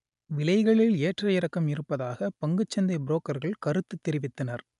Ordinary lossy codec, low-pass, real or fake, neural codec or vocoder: none; 10.8 kHz; real; none